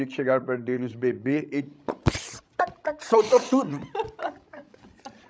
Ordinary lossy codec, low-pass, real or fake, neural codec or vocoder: none; none; fake; codec, 16 kHz, 16 kbps, FreqCodec, larger model